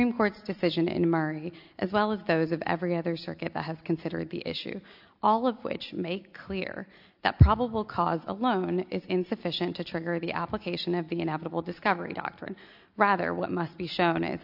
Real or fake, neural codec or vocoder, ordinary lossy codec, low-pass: real; none; AAC, 48 kbps; 5.4 kHz